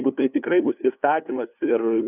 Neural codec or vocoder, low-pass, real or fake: codec, 16 kHz, 2 kbps, FunCodec, trained on LibriTTS, 25 frames a second; 3.6 kHz; fake